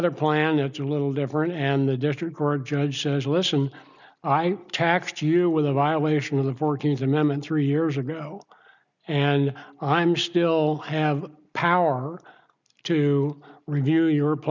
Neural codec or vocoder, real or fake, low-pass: none; real; 7.2 kHz